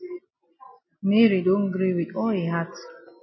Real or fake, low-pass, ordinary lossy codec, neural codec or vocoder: real; 7.2 kHz; MP3, 24 kbps; none